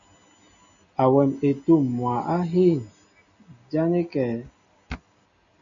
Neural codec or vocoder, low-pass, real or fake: none; 7.2 kHz; real